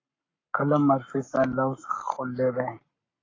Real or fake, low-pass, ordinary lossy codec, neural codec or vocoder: fake; 7.2 kHz; AAC, 32 kbps; codec, 44.1 kHz, 7.8 kbps, Pupu-Codec